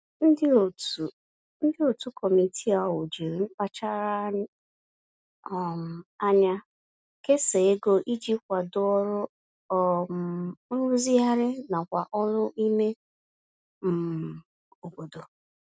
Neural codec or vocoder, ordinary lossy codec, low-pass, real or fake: none; none; none; real